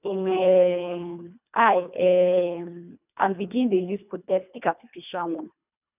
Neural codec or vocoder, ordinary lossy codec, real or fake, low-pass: codec, 24 kHz, 1.5 kbps, HILCodec; none; fake; 3.6 kHz